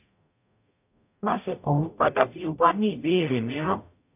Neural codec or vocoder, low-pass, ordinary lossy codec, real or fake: codec, 44.1 kHz, 0.9 kbps, DAC; 3.6 kHz; none; fake